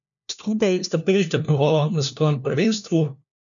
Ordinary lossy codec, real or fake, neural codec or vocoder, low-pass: none; fake; codec, 16 kHz, 1 kbps, FunCodec, trained on LibriTTS, 50 frames a second; 7.2 kHz